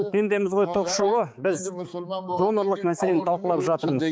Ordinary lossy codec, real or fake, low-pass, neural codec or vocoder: none; fake; none; codec, 16 kHz, 4 kbps, X-Codec, HuBERT features, trained on balanced general audio